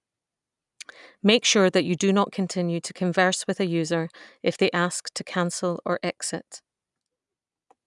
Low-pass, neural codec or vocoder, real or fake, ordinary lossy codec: 10.8 kHz; none; real; none